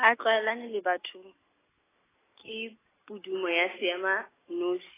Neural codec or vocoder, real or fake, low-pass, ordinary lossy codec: none; real; 3.6 kHz; AAC, 16 kbps